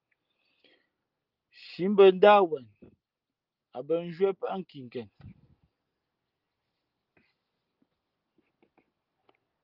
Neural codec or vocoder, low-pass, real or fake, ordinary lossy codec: none; 5.4 kHz; real; Opus, 24 kbps